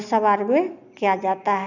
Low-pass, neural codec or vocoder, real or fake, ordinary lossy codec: 7.2 kHz; none; real; none